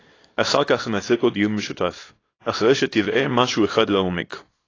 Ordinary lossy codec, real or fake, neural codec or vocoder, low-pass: AAC, 32 kbps; fake; codec, 24 kHz, 0.9 kbps, WavTokenizer, small release; 7.2 kHz